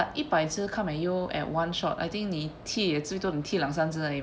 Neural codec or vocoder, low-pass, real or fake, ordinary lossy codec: none; none; real; none